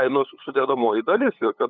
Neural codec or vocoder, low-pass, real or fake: codec, 16 kHz, 8 kbps, FunCodec, trained on LibriTTS, 25 frames a second; 7.2 kHz; fake